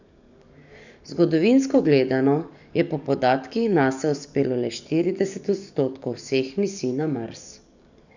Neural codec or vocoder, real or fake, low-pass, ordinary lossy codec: codec, 44.1 kHz, 7.8 kbps, DAC; fake; 7.2 kHz; none